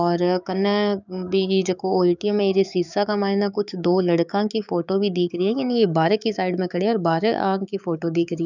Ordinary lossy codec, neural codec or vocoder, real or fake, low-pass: none; codec, 16 kHz, 6 kbps, DAC; fake; 7.2 kHz